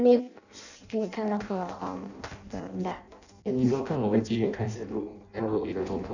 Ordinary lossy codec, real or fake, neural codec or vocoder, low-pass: none; fake; codec, 16 kHz in and 24 kHz out, 0.6 kbps, FireRedTTS-2 codec; 7.2 kHz